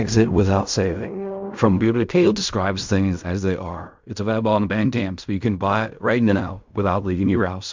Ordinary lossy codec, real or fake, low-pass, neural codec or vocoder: MP3, 64 kbps; fake; 7.2 kHz; codec, 16 kHz in and 24 kHz out, 0.4 kbps, LongCat-Audio-Codec, fine tuned four codebook decoder